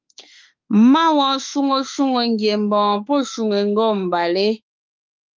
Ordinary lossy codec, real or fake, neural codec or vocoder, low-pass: Opus, 32 kbps; fake; autoencoder, 48 kHz, 32 numbers a frame, DAC-VAE, trained on Japanese speech; 7.2 kHz